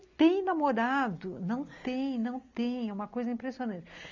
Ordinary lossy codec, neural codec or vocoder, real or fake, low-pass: none; none; real; 7.2 kHz